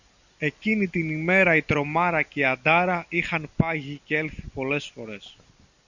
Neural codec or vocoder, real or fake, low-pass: none; real; 7.2 kHz